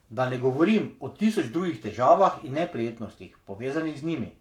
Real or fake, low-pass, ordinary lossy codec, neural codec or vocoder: fake; 19.8 kHz; none; codec, 44.1 kHz, 7.8 kbps, Pupu-Codec